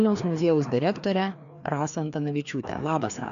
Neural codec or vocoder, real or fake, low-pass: codec, 16 kHz, 2 kbps, FreqCodec, larger model; fake; 7.2 kHz